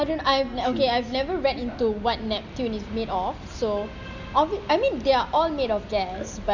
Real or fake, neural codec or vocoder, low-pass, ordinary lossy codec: real; none; 7.2 kHz; none